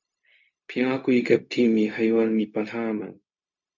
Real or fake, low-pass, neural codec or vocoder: fake; 7.2 kHz; codec, 16 kHz, 0.4 kbps, LongCat-Audio-Codec